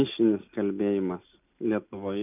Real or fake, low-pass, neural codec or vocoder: real; 3.6 kHz; none